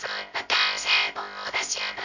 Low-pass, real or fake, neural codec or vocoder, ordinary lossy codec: 7.2 kHz; fake; codec, 16 kHz, 0.3 kbps, FocalCodec; none